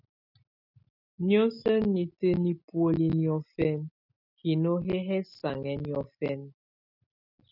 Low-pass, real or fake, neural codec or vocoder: 5.4 kHz; real; none